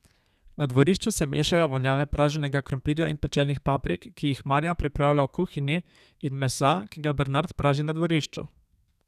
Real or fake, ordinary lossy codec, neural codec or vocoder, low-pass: fake; none; codec, 32 kHz, 1.9 kbps, SNAC; 14.4 kHz